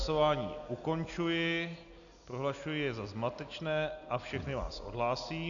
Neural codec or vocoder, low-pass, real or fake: none; 7.2 kHz; real